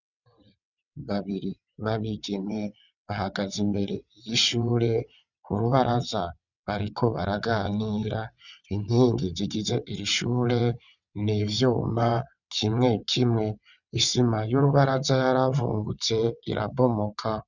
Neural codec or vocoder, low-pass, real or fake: vocoder, 22.05 kHz, 80 mel bands, WaveNeXt; 7.2 kHz; fake